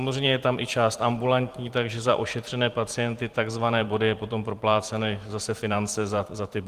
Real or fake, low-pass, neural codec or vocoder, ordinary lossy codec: fake; 14.4 kHz; vocoder, 44.1 kHz, 128 mel bands every 512 samples, BigVGAN v2; Opus, 24 kbps